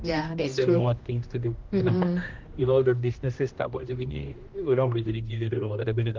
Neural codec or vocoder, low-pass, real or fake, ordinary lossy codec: codec, 16 kHz, 1 kbps, X-Codec, HuBERT features, trained on general audio; 7.2 kHz; fake; Opus, 24 kbps